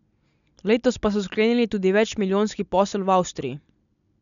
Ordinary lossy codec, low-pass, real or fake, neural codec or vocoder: none; 7.2 kHz; real; none